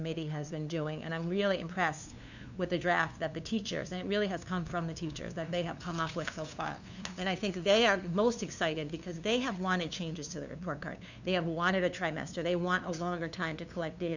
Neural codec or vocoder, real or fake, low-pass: codec, 16 kHz, 2 kbps, FunCodec, trained on LibriTTS, 25 frames a second; fake; 7.2 kHz